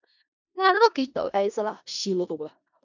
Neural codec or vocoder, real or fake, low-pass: codec, 16 kHz in and 24 kHz out, 0.4 kbps, LongCat-Audio-Codec, four codebook decoder; fake; 7.2 kHz